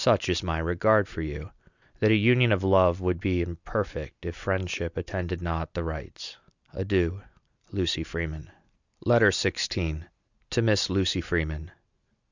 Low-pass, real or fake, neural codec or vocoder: 7.2 kHz; real; none